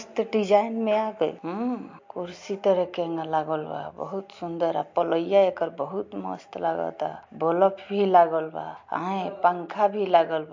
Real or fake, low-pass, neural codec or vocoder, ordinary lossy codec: real; 7.2 kHz; none; MP3, 48 kbps